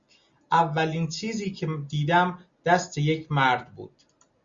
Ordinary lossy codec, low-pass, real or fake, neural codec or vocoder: Opus, 64 kbps; 7.2 kHz; real; none